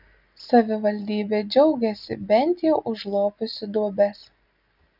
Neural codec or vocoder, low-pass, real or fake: none; 5.4 kHz; real